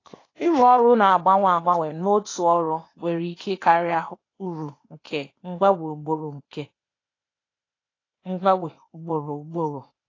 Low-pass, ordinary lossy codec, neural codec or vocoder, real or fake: 7.2 kHz; AAC, 32 kbps; codec, 16 kHz, 0.8 kbps, ZipCodec; fake